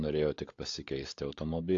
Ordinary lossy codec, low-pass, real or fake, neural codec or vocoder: AAC, 48 kbps; 7.2 kHz; fake; codec, 16 kHz, 16 kbps, FunCodec, trained on LibriTTS, 50 frames a second